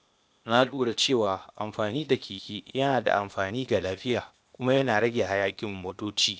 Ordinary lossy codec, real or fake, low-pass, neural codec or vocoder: none; fake; none; codec, 16 kHz, 0.8 kbps, ZipCodec